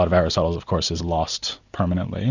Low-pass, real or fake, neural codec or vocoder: 7.2 kHz; real; none